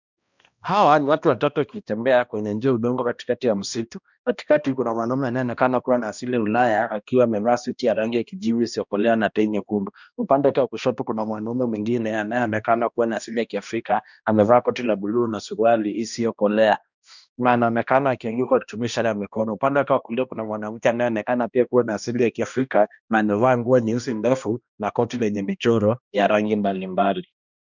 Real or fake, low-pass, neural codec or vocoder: fake; 7.2 kHz; codec, 16 kHz, 1 kbps, X-Codec, HuBERT features, trained on balanced general audio